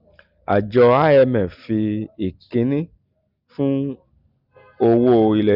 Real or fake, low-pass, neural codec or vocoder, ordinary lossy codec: real; 5.4 kHz; none; none